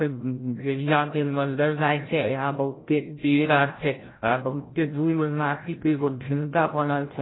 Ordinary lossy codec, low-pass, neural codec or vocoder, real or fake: AAC, 16 kbps; 7.2 kHz; codec, 16 kHz, 0.5 kbps, FreqCodec, larger model; fake